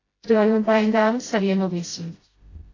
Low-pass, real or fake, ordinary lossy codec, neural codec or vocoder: 7.2 kHz; fake; AAC, 32 kbps; codec, 16 kHz, 0.5 kbps, FreqCodec, smaller model